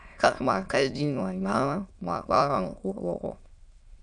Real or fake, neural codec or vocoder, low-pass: fake; autoencoder, 22.05 kHz, a latent of 192 numbers a frame, VITS, trained on many speakers; 9.9 kHz